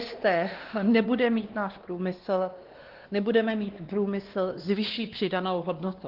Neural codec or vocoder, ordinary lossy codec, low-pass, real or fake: codec, 16 kHz, 2 kbps, X-Codec, WavLM features, trained on Multilingual LibriSpeech; Opus, 16 kbps; 5.4 kHz; fake